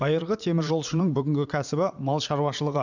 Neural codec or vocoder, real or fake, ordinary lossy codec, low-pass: vocoder, 44.1 kHz, 80 mel bands, Vocos; fake; none; 7.2 kHz